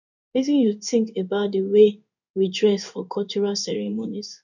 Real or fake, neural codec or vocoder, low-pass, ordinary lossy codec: fake; codec, 16 kHz in and 24 kHz out, 1 kbps, XY-Tokenizer; 7.2 kHz; none